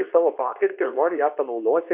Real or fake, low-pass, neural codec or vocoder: fake; 3.6 kHz; codec, 24 kHz, 0.9 kbps, WavTokenizer, medium speech release version 2